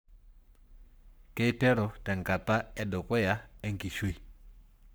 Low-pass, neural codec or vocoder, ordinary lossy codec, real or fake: none; codec, 44.1 kHz, 7.8 kbps, Pupu-Codec; none; fake